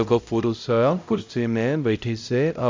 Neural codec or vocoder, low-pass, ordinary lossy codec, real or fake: codec, 16 kHz, 0.5 kbps, X-Codec, HuBERT features, trained on LibriSpeech; 7.2 kHz; MP3, 64 kbps; fake